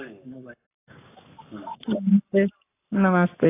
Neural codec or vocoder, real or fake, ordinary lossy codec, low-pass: codec, 44.1 kHz, 7.8 kbps, Pupu-Codec; fake; AAC, 24 kbps; 3.6 kHz